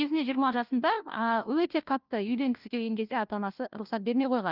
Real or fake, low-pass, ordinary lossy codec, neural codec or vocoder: fake; 5.4 kHz; Opus, 32 kbps; codec, 16 kHz, 1 kbps, FunCodec, trained on LibriTTS, 50 frames a second